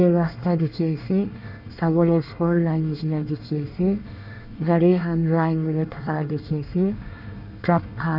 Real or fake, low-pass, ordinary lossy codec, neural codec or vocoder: fake; 5.4 kHz; none; codec, 24 kHz, 1 kbps, SNAC